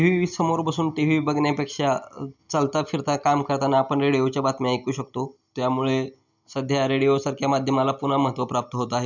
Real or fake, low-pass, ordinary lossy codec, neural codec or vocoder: real; 7.2 kHz; none; none